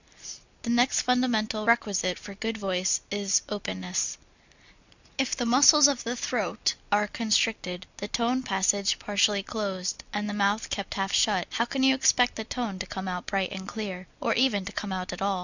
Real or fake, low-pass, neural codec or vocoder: real; 7.2 kHz; none